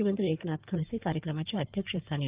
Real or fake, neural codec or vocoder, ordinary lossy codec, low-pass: fake; codec, 16 kHz in and 24 kHz out, 2.2 kbps, FireRedTTS-2 codec; Opus, 32 kbps; 3.6 kHz